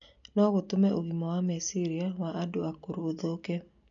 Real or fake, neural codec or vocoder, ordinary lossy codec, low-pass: real; none; none; 7.2 kHz